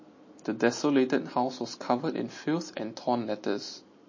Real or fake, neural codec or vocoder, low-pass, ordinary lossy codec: real; none; 7.2 kHz; MP3, 32 kbps